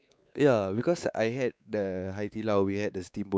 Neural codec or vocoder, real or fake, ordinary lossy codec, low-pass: codec, 16 kHz, 4 kbps, X-Codec, WavLM features, trained on Multilingual LibriSpeech; fake; none; none